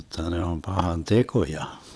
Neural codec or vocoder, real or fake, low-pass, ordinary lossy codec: vocoder, 22.05 kHz, 80 mel bands, WaveNeXt; fake; none; none